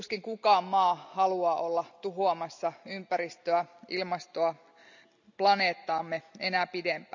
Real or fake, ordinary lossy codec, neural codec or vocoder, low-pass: real; none; none; 7.2 kHz